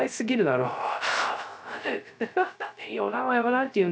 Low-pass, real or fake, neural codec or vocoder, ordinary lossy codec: none; fake; codec, 16 kHz, 0.3 kbps, FocalCodec; none